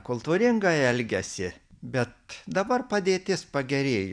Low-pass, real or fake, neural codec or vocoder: 9.9 kHz; real; none